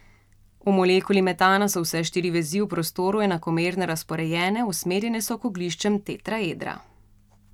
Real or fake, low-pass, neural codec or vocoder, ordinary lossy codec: real; 19.8 kHz; none; none